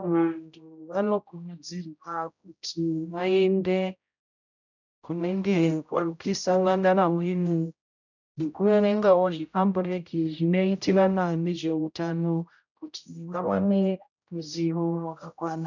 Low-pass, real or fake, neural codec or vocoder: 7.2 kHz; fake; codec, 16 kHz, 0.5 kbps, X-Codec, HuBERT features, trained on general audio